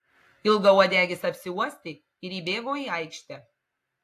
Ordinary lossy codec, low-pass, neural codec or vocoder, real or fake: AAC, 64 kbps; 14.4 kHz; none; real